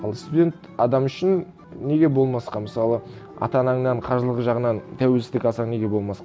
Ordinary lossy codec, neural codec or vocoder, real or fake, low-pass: none; none; real; none